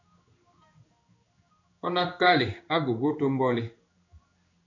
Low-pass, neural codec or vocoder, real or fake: 7.2 kHz; codec, 16 kHz in and 24 kHz out, 1 kbps, XY-Tokenizer; fake